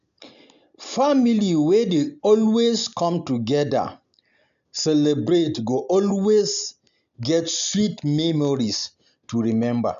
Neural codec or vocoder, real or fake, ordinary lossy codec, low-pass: none; real; MP3, 64 kbps; 7.2 kHz